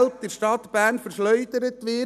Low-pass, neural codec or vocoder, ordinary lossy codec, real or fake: 14.4 kHz; none; none; real